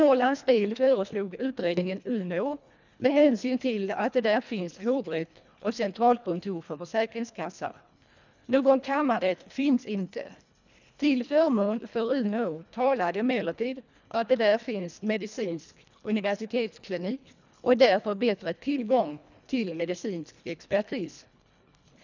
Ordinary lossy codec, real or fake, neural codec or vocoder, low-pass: none; fake; codec, 24 kHz, 1.5 kbps, HILCodec; 7.2 kHz